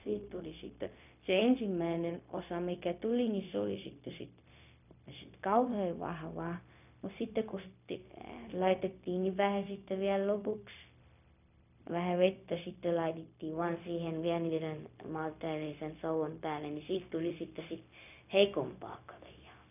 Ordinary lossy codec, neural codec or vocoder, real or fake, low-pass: none; codec, 16 kHz, 0.4 kbps, LongCat-Audio-Codec; fake; 3.6 kHz